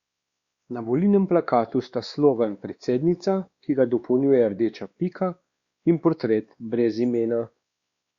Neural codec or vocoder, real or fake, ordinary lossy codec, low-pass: codec, 16 kHz, 2 kbps, X-Codec, WavLM features, trained on Multilingual LibriSpeech; fake; Opus, 64 kbps; 7.2 kHz